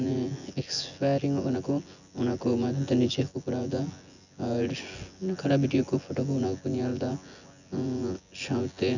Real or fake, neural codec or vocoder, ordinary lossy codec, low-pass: fake; vocoder, 24 kHz, 100 mel bands, Vocos; none; 7.2 kHz